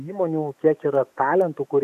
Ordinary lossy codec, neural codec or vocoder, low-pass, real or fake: AAC, 96 kbps; autoencoder, 48 kHz, 128 numbers a frame, DAC-VAE, trained on Japanese speech; 14.4 kHz; fake